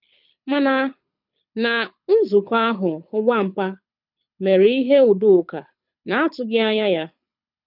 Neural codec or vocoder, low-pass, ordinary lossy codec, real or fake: codec, 24 kHz, 6 kbps, HILCodec; 5.4 kHz; none; fake